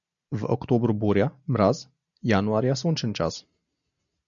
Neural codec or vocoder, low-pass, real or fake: none; 7.2 kHz; real